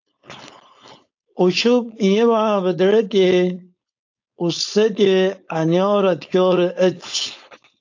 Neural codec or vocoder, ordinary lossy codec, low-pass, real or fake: codec, 16 kHz, 4.8 kbps, FACodec; AAC, 48 kbps; 7.2 kHz; fake